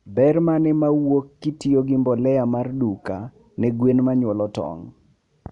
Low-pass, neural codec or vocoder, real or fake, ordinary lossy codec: 10.8 kHz; none; real; none